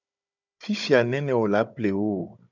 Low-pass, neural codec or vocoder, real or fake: 7.2 kHz; codec, 16 kHz, 16 kbps, FunCodec, trained on Chinese and English, 50 frames a second; fake